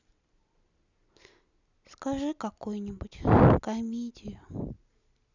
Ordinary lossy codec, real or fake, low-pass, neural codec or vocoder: none; real; 7.2 kHz; none